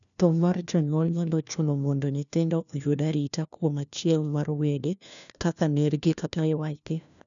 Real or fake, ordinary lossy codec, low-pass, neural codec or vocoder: fake; none; 7.2 kHz; codec, 16 kHz, 1 kbps, FunCodec, trained on LibriTTS, 50 frames a second